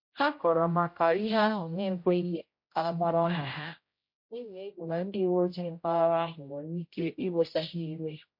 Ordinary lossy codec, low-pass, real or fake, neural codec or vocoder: MP3, 32 kbps; 5.4 kHz; fake; codec, 16 kHz, 0.5 kbps, X-Codec, HuBERT features, trained on general audio